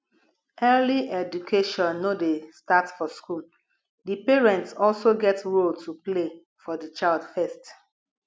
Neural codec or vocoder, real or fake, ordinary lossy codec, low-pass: none; real; none; none